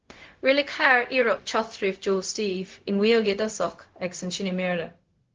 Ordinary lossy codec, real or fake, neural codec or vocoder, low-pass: Opus, 16 kbps; fake; codec, 16 kHz, 0.4 kbps, LongCat-Audio-Codec; 7.2 kHz